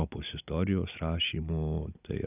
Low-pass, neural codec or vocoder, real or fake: 3.6 kHz; none; real